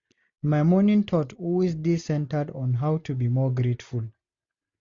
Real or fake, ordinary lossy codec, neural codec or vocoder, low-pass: real; MP3, 48 kbps; none; 7.2 kHz